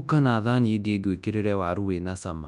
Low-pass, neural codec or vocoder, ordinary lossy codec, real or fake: 10.8 kHz; codec, 24 kHz, 0.9 kbps, WavTokenizer, large speech release; none; fake